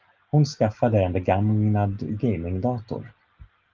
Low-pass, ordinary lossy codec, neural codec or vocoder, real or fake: 7.2 kHz; Opus, 32 kbps; none; real